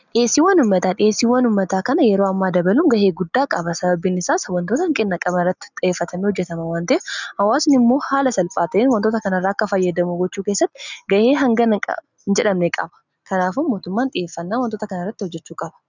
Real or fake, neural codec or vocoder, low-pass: real; none; 7.2 kHz